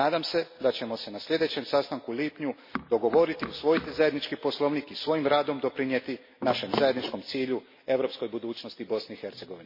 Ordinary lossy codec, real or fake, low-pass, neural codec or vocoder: MP3, 24 kbps; real; 5.4 kHz; none